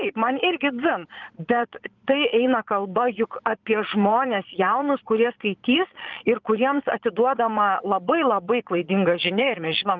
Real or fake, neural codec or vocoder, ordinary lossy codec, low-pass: fake; vocoder, 22.05 kHz, 80 mel bands, Vocos; Opus, 32 kbps; 7.2 kHz